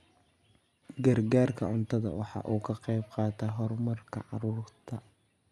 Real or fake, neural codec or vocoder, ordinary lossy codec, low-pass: real; none; none; none